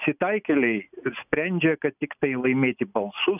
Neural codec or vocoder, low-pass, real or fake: none; 3.6 kHz; real